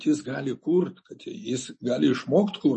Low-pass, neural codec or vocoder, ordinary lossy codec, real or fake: 10.8 kHz; none; MP3, 32 kbps; real